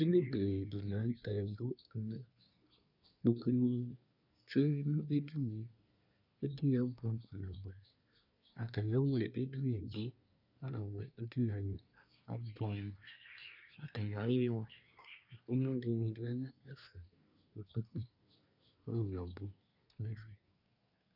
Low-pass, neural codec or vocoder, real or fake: 5.4 kHz; codec, 24 kHz, 1 kbps, SNAC; fake